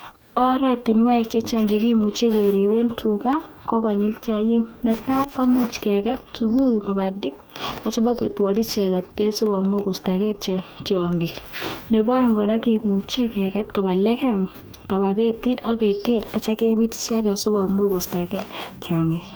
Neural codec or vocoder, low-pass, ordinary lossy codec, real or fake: codec, 44.1 kHz, 2.6 kbps, DAC; none; none; fake